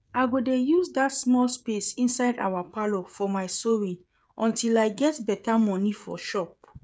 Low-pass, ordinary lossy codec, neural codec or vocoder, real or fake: none; none; codec, 16 kHz, 16 kbps, FreqCodec, smaller model; fake